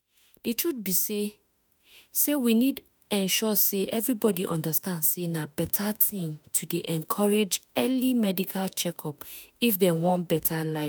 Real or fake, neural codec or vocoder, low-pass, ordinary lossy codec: fake; autoencoder, 48 kHz, 32 numbers a frame, DAC-VAE, trained on Japanese speech; none; none